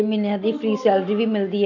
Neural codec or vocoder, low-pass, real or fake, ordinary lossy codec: none; 7.2 kHz; real; none